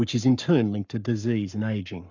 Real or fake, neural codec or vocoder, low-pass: fake; codec, 44.1 kHz, 7.8 kbps, Pupu-Codec; 7.2 kHz